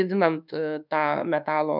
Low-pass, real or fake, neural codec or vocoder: 5.4 kHz; fake; codec, 24 kHz, 1.2 kbps, DualCodec